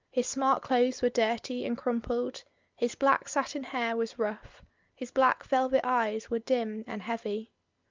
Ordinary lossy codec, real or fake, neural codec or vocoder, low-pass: Opus, 32 kbps; real; none; 7.2 kHz